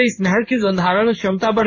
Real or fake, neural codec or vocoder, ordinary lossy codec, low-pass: fake; codec, 16 kHz in and 24 kHz out, 1 kbps, XY-Tokenizer; AAC, 48 kbps; 7.2 kHz